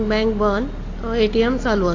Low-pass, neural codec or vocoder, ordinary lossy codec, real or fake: 7.2 kHz; none; AAC, 32 kbps; real